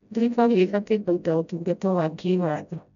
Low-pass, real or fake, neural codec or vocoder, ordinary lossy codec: 7.2 kHz; fake; codec, 16 kHz, 0.5 kbps, FreqCodec, smaller model; none